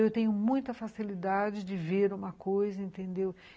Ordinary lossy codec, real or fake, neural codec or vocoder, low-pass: none; real; none; none